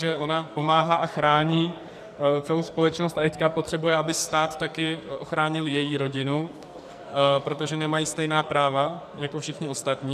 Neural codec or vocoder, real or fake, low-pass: codec, 44.1 kHz, 2.6 kbps, SNAC; fake; 14.4 kHz